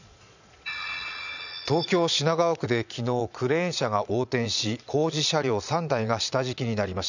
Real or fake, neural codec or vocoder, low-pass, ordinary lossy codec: fake; vocoder, 22.05 kHz, 80 mel bands, Vocos; 7.2 kHz; none